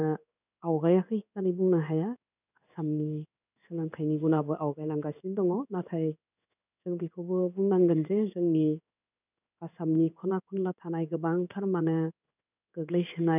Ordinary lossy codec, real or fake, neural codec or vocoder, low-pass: none; fake; codec, 16 kHz in and 24 kHz out, 1 kbps, XY-Tokenizer; 3.6 kHz